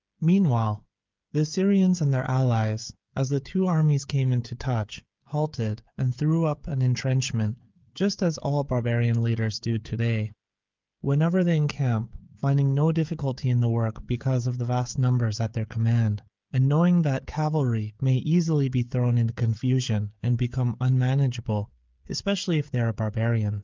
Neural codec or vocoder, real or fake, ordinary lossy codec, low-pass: codec, 16 kHz, 16 kbps, FreqCodec, smaller model; fake; Opus, 32 kbps; 7.2 kHz